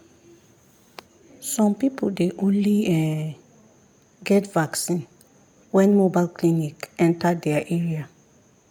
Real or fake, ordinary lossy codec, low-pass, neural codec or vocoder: real; MP3, 96 kbps; 19.8 kHz; none